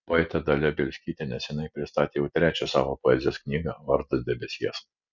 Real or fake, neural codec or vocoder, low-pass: real; none; 7.2 kHz